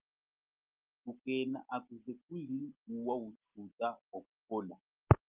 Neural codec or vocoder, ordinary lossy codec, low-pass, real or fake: none; Opus, 32 kbps; 3.6 kHz; real